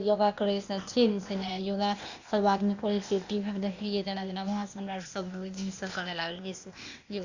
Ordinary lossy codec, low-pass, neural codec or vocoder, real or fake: Opus, 64 kbps; 7.2 kHz; codec, 16 kHz, 0.8 kbps, ZipCodec; fake